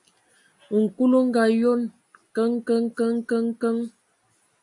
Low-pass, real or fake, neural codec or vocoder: 10.8 kHz; real; none